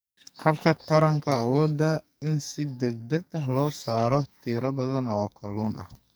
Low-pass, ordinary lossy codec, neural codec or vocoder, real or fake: none; none; codec, 44.1 kHz, 2.6 kbps, SNAC; fake